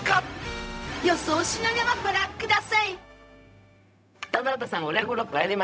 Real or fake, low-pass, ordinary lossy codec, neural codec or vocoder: fake; none; none; codec, 16 kHz, 0.4 kbps, LongCat-Audio-Codec